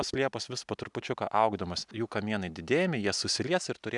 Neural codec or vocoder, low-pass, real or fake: none; 10.8 kHz; real